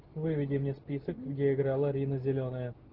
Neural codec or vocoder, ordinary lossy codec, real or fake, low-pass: none; Opus, 16 kbps; real; 5.4 kHz